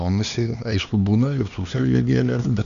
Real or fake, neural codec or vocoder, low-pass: fake; codec, 16 kHz, 2 kbps, X-Codec, HuBERT features, trained on LibriSpeech; 7.2 kHz